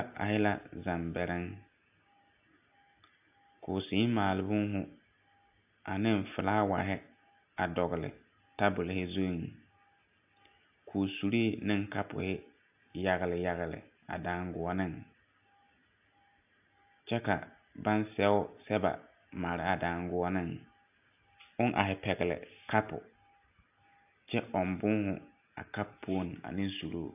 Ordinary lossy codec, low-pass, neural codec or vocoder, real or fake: AAC, 32 kbps; 3.6 kHz; none; real